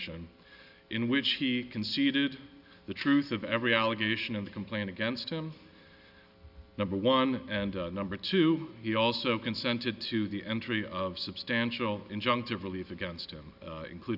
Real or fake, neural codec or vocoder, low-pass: real; none; 5.4 kHz